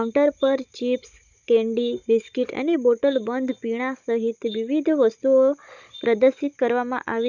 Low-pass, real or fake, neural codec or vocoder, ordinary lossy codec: 7.2 kHz; fake; codec, 16 kHz, 16 kbps, FunCodec, trained on Chinese and English, 50 frames a second; none